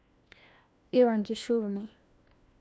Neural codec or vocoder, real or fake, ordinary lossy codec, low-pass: codec, 16 kHz, 1 kbps, FunCodec, trained on LibriTTS, 50 frames a second; fake; none; none